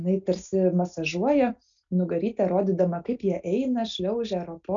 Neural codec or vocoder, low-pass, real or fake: none; 7.2 kHz; real